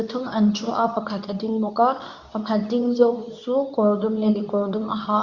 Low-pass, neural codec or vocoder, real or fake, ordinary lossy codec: 7.2 kHz; codec, 24 kHz, 0.9 kbps, WavTokenizer, medium speech release version 2; fake; Opus, 64 kbps